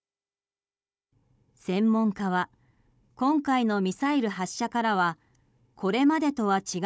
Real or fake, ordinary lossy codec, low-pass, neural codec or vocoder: fake; none; none; codec, 16 kHz, 16 kbps, FunCodec, trained on Chinese and English, 50 frames a second